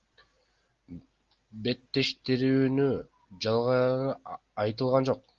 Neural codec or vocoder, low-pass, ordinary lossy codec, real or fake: none; 7.2 kHz; Opus, 32 kbps; real